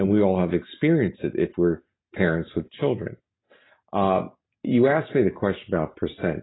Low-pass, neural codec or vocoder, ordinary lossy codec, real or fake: 7.2 kHz; none; AAC, 16 kbps; real